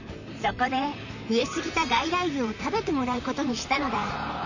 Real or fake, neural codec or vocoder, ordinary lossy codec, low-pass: fake; vocoder, 44.1 kHz, 128 mel bands, Pupu-Vocoder; none; 7.2 kHz